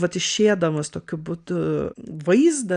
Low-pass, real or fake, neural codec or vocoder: 9.9 kHz; real; none